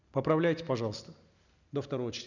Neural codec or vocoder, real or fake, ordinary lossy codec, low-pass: none; real; none; 7.2 kHz